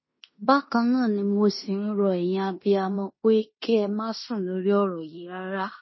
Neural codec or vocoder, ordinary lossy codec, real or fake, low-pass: codec, 16 kHz in and 24 kHz out, 0.9 kbps, LongCat-Audio-Codec, fine tuned four codebook decoder; MP3, 24 kbps; fake; 7.2 kHz